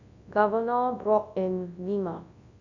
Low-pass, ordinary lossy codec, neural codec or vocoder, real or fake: 7.2 kHz; Opus, 64 kbps; codec, 24 kHz, 0.9 kbps, WavTokenizer, large speech release; fake